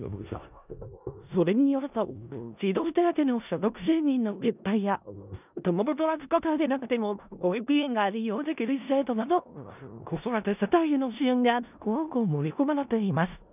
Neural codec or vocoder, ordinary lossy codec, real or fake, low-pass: codec, 16 kHz in and 24 kHz out, 0.4 kbps, LongCat-Audio-Codec, four codebook decoder; none; fake; 3.6 kHz